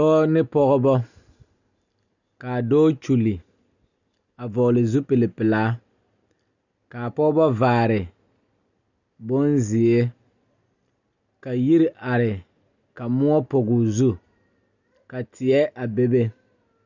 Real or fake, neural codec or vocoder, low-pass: real; none; 7.2 kHz